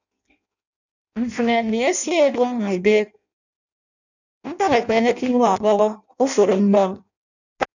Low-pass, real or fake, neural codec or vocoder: 7.2 kHz; fake; codec, 16 kHz in and 24 kHz out, 0.6 kbps, FireRedTTS-2 codec